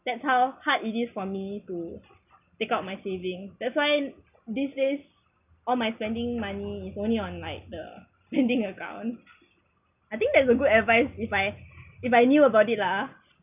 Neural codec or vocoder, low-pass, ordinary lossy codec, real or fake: none; 3.6 kHz; none; real